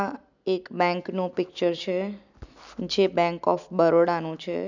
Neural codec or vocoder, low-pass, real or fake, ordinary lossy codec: none; 7.2 kHz; real; none